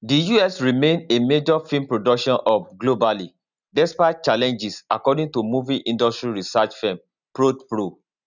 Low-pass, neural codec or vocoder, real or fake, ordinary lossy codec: 7.2 kHz; none; real; none